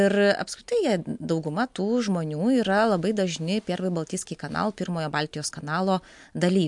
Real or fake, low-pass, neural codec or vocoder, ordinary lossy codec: real; 10.8 kHz; none; MP3, 64 kbps